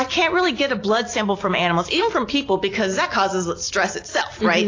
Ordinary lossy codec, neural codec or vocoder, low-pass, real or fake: AAC, 32 kbps; none; 7.2 kHz; real